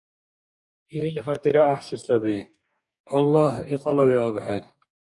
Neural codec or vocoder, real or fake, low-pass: codec, 44.1 kHz, 2.6 kbps, DAC; fake; 10.8 kHz